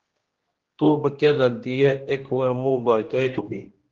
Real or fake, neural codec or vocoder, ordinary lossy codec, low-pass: fake; codec, 16 kHz, 1 kbps, X-Codec, HuBERT features, trained on general audio; Opus, 16 kbps; 7.2 kHz